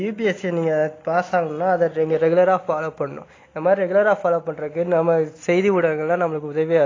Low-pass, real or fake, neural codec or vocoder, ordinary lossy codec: 7.2 kHz; real; none; AAC, 32 kbps